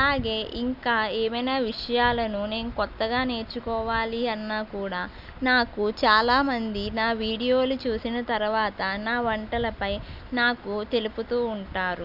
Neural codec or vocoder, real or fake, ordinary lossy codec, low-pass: none; real; none; 5.4 kHz